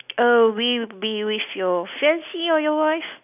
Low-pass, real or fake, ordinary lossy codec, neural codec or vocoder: 3.6 kHz; real; none; none